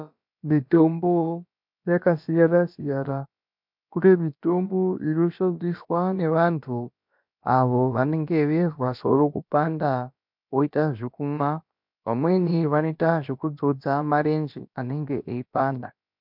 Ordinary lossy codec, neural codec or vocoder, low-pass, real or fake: MP3, 48 kbps; codec, 16 kHz, about 1 kbps, DyCAST, with the encoder's durations; 5.4 kHz; fake